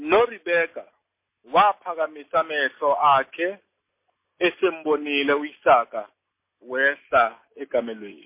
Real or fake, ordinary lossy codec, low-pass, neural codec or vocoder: real; MP3, 24 kbps; 3.6 kHz; none